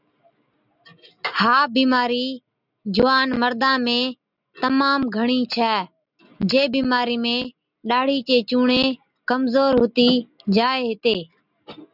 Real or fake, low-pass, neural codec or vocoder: real; 5.4 kHz; none